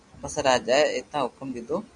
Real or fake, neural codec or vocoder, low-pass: real; none; 10.8 kHz